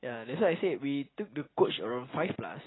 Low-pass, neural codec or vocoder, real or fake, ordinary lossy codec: 7.2 kHz; none; real; AAC, 16 kbps